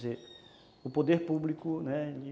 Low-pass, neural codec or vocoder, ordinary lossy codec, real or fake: none; none; none; real